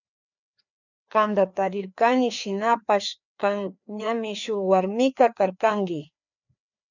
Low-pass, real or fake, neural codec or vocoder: 7.2 kHz; fake; codec, 16 kHz, 2 kbps, FreqCodec, larger model